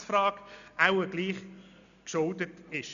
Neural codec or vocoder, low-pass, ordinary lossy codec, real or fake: none; 7.2 kHz; none; real